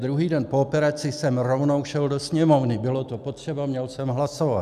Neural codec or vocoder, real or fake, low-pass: none; real; 14.4 kHz